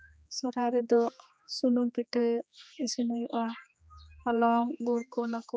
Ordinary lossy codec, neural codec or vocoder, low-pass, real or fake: none; codec, 16 kHz, 2 kbps, X-Codec, HuBERT features, trained on general audio; none; fake